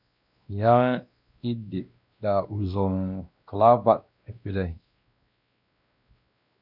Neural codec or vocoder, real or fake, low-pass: codec, 16 kHz, 1 kbps, X-Codec, WavLM features, trained on Multilingual LibriSpeech; fake; 5.4 kHz